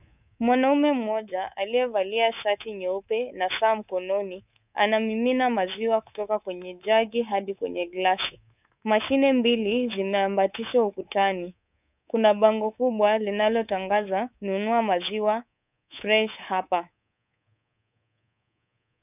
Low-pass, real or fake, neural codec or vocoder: 3.6 kHz; fake; codec, 24 kHz, 3.1 kbps, DualCodec